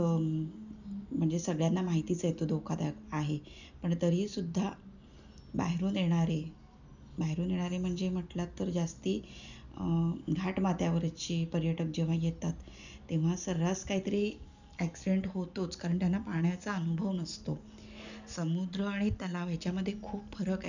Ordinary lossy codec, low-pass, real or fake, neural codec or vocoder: none; 7.2 kHz; real; none